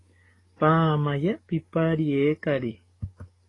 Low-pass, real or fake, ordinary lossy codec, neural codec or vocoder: 10.8 kHz; fake; AAC, 32 kbps; codec, 44.1 kHz, 7.8 kbps, DAC